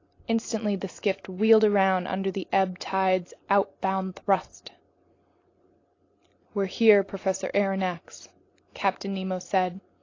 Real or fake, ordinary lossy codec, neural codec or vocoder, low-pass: real; AAC, 32 kbps; none; 7.2 kHz